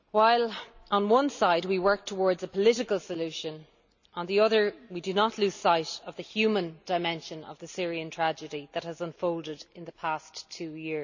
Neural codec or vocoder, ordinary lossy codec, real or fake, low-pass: none; none; real; 7.2 kHz